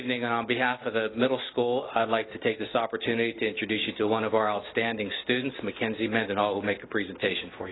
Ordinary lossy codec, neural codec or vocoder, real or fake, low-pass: AAC, 16 kbps; none; real; 7.2 kHz